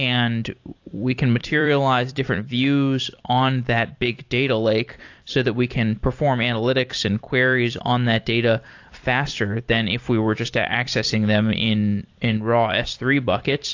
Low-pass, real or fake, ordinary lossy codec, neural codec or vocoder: 7.2 kHz; fake; AAC, 48 kbps; vocoder, 44.1 kHz, 128 mel bands every 256 samples, BigVGAN v2